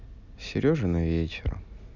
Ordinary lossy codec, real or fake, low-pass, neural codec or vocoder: none; real; 7.2 kHz; none